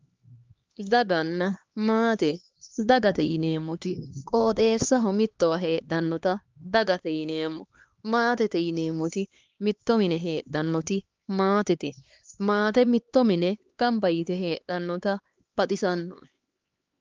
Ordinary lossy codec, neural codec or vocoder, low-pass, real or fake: Opus, 32 kbps; codec, 16 kHz, 2 kbps, X-Codec, HuBERT features, trained on LibriSpeech; 7.2 kHz; fake